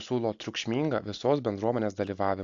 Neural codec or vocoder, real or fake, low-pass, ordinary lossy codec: none; real; 7.2 kHz; AAC, 48 kbps